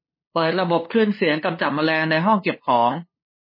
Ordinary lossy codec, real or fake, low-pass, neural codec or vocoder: MP3, 24 kbps; fake; 5.4 kHz; codec, 16 kHz, 2 kbps, FunCodec, trained on LibriTTS, 25 frames a second